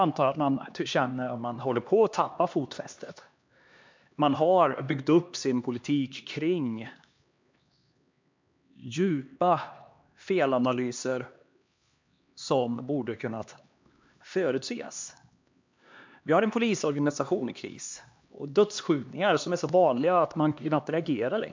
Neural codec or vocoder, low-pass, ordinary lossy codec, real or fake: codec, 16 kHz, 2 kbps, X-Codec, HuBERT features, trained on LibriSpeech; 7.2 kHz; MP3, 64 kbps; fake